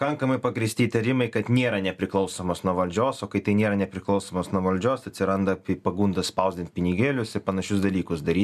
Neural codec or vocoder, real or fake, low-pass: none; real; 14.4 kHz